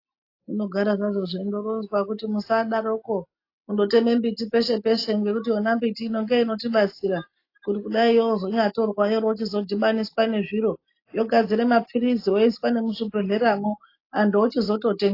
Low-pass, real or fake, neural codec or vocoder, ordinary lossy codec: 5.4 kHz; real; none; AAC, 32 kbps